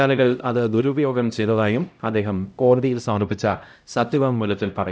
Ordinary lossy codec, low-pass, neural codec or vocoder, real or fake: none; none; codec, 16 kHz, 0.5 kbps, X-Codec, HuBERT features, trained on LibriSpeech; fake